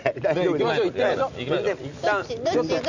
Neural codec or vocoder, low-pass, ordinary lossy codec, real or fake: none; 7.2 kHz; none; real